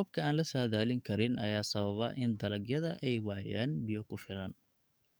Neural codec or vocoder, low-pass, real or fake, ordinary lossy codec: codec, 44.1 kHz, 7.8 kbps, DAC; none; fake; none